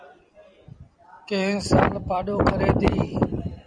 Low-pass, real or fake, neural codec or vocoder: 9.9 kHz; fake; vocoder, 44.1 kHz, 128 mel bands every 256 samples, BigVGAN v2